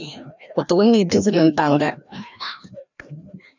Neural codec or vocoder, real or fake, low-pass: codec, 16 kHz, 1 kbps, FreqCodec, larger model; fake; 7.2 kHz